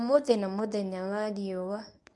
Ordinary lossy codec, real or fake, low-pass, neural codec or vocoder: none; fake; none; codec, 24 kHz, 0.9 kbps, WavTokenizer, medium speech release version 1